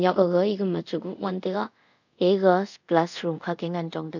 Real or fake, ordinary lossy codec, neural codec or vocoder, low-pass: fake; none; codec, 24 kHz, 0.5 kbps, DualCodec; 7.2 kHz